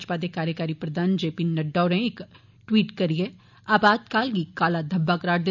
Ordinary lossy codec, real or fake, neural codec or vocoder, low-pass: none; real; none; 7.2 kHz